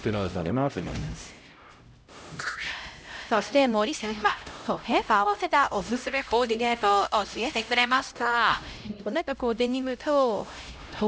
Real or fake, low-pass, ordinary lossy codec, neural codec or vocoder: fake; none; none; codec, 16 kHz, 0.5 kbps, X-Codec, HuBERT features, trained on LibriSpeech